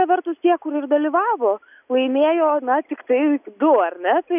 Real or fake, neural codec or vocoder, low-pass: real; none; 3.6 kHz